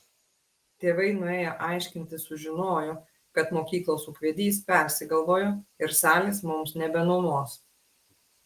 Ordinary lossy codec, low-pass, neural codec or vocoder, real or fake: Opus, 24 kbps; 14.4 kHz; none; real